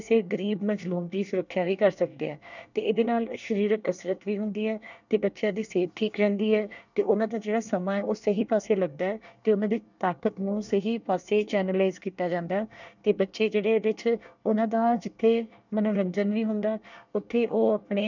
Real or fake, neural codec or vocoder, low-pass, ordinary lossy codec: fake; codec, 24 kHz, 1 kbps, SNAC; 7.2 kHz; none